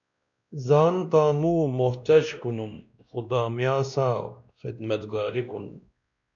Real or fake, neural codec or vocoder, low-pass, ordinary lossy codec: fake; codec, 16 kHz, 1 kbps, X-Codec, WavLM features, trained on Multilingual LibriSpeech; 7.2 kHz; MP3, 96 kbps